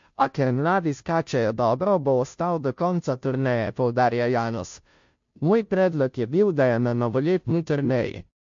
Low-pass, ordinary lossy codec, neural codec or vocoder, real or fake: 7.2 kHz; MP3, 48 kbps; codec, 16 kHz, 0.5 kbps, FunCodec, trained on Chinese and English, 25 frames a second; fake